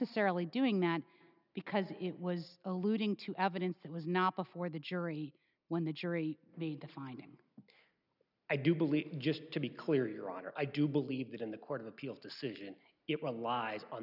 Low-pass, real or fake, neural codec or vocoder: 5.4 kHz; real; none